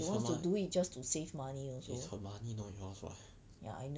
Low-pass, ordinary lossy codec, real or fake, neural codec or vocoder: none; none; real; none